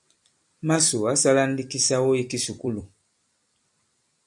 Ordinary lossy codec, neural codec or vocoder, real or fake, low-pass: MP3, 64 kbps; vocoder, 44.1 kHz, 128 mel bands every 256 samples, BigVGAN v2; fake; 10.8 kHz